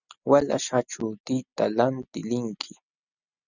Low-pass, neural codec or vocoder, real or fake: 7.2 kHz; none; real